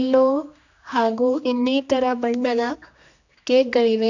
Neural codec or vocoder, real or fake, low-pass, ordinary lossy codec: codec, 16 kHz, 2 kbps, X-Codec, HuBERT features, trained on general audio; fake; 7.2 kHz; none